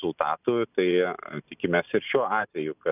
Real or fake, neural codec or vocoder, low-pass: real; none; 3.6 kHz